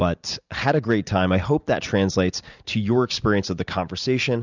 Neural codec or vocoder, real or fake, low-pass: none; real; 7.2 kHz